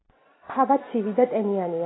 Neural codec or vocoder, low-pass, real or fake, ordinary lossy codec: none; 7.2 kHz; real; AAC, 16 kbps